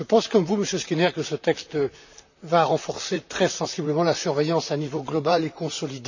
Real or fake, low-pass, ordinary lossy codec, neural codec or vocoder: fake; 7.2 kHz; none; vocoder, 44.1 kHz, 128 mel bands, Pupu-Vocoder